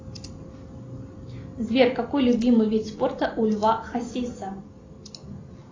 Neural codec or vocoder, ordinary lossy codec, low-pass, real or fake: none; AAC, 32 kbps; 7.2 kHz; real